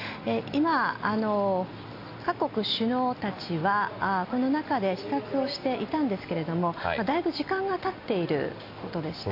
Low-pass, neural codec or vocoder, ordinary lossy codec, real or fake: 5.4 kHz; none; AAC, 32 kbps; real